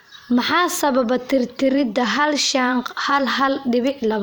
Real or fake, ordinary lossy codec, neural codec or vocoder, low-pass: fake; none; vocoder, 44.1 kHz, 128 mel bands every 512 samples, BigVGAN v2; none